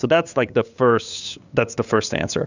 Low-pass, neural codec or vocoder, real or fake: 7.2 kHz; codec, 16 kHz, 8 kbps, FunCodec, trained on LibriTTS, 25 frames a second; fake